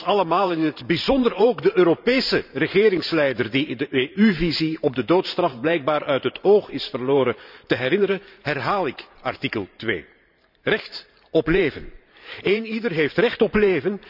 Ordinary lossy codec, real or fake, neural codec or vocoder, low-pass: none; fake; vocoder, 44.1 kHz, 128 mel bands every 512 samples, BigVGAN v2; 5.4 kHz